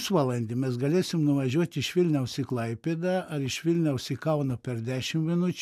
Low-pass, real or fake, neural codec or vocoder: 14.4 kHz; real; none